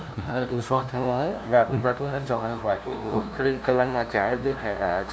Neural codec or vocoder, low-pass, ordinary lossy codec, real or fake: codec, 16 kHz, 0.5 kbps, FunCodec, trained on LibriTTS, 25 frames a second; none; none; fake